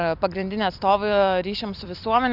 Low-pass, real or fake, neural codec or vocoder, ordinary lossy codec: 5.4 kHz; fake; vocoder, 44.1 kHz, 128 mel bands every 256 samples, BigVGAN v2; AAC, 48 kbps